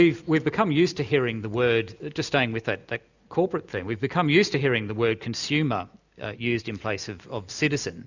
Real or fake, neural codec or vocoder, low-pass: real; none; 7.2 kHz